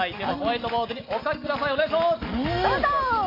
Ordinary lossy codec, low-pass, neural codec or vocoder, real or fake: AAC, 24 kbps; 5.4 kHz; none; real